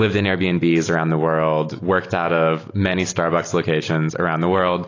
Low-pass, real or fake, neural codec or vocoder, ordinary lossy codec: 7.2 kHz; real; none; AAC, 32 kbps